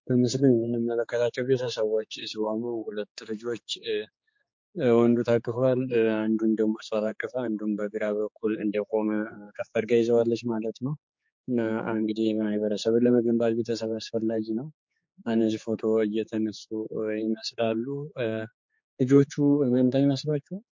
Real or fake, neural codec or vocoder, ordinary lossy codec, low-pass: fake; codec, 16 kHz, 4 kbps, X-Codec, HuBERT features, trained on general audio; MP3, 48 kbps; 7.2 kHz